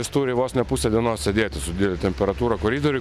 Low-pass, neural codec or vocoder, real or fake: 14.4 kHz; none; real